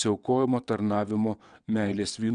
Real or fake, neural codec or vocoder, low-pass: fake; vocoder, 22.05 kHz, 80 mel bands, WaveNeXt; 9.9 kHz